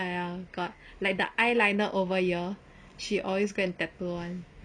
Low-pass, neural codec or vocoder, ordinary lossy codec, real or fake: 9.9 kHz; none; none; real